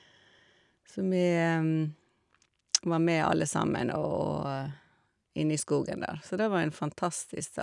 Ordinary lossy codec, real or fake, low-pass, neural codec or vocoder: none; real; 10.8 kHz; none